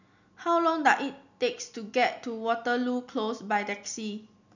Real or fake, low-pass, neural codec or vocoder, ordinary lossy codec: real; 7.2 kHz; none; none